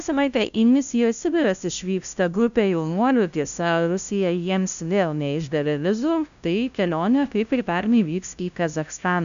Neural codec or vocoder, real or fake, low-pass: codec, 16 kHz, 0.5 kbps, FunCodec, trained on LibriTTS, 25 frames a second; fake; 7.2 kHz